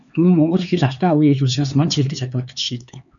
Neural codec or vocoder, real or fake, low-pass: codec, 16 kHz, 4 kbps, X-Codec, HuBERT features, trained on LibriSpeech; fake; 7.2 kHz